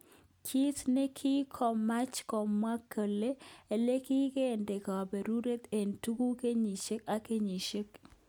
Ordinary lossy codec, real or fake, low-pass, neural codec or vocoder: none; real; none; none